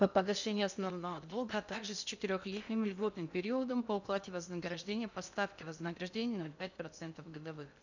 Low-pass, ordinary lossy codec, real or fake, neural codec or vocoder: 7.2 kHz; none; fake; codec, 16 kHz in and 24 kHz out, 0.8 kbps, FocalCodec, streaming, 65536 codes